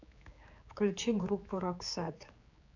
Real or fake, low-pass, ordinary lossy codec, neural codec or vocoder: fake; 7.2 kHz; AAC, 48 kbps; codec, 16 kHz, 4 kbps, X-Codec, HuBERT features, trained on general audio